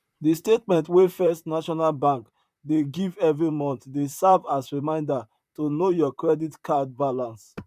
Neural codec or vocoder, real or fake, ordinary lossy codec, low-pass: vocoder, 44.1 kHz, 128 mel bands, Pupu-Vocoder; fake; none; 14.4 kHz